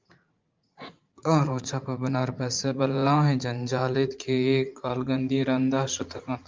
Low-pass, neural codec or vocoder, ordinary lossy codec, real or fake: 7.2 kHz; vocoder, 44.1 kHz, 80 mel bands, Vocos; Opus, 24 kbps; fake